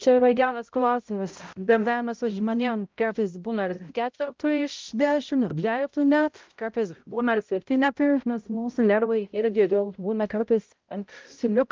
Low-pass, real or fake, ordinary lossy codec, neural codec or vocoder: 7.2 kHz; fake; Opus, 32 kbps; codec, 16 kHz, 0.5 kbps, X-Codec, HuBERT features, trained on balanced general audio